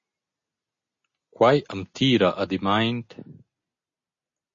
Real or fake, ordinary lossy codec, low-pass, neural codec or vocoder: real; MP3, 32 kbps; 7.2 kHz; none